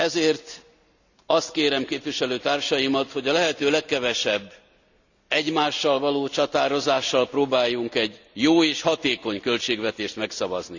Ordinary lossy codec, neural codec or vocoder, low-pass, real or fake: none; none; 7.2 kHz; real